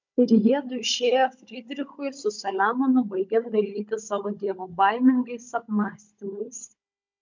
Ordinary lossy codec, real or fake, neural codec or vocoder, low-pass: MP3, 64 kbps; fake; codec, 16 kHz, 16 kbps, FunCodec, trained on Chinese and English, 50 frames a second; 7.2 kHz